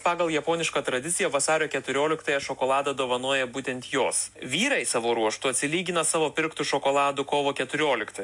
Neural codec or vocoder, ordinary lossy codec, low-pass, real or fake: none; MP3, 64 kbps; 10.8 kHz; real